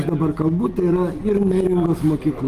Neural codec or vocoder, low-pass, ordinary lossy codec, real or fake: none; 14.4 kHz; Opus, 32 kbps; real